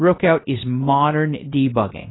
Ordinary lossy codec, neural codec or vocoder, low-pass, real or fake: AAC, 16 kbps; codec, 16 kHz, 0.3 kbps, FocalCodec; 7.2 kHz; fake